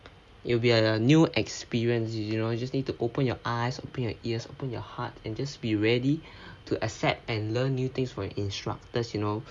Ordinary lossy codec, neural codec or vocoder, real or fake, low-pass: none; none; real; none